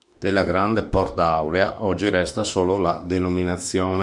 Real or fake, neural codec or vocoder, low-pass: fake; autoencoder, 48 kHz, 32 numbers a frame, DAC-VAE, trained on Japanese speech; 10.8 kHz